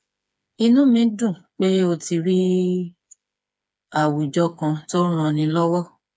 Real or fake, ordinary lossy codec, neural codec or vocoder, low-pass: fake; none; codec, 16 kHz, 4 kbps, FreqCodec, smaller model; none